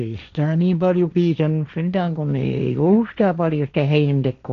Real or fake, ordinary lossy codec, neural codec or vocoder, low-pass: fake; none; codec, 16 kHz, 1.1 kbps, Voila-Tokenizer; 7.2 kHz